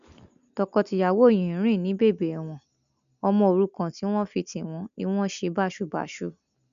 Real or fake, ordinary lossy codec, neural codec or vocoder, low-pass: real; none; none; 7.2 kHz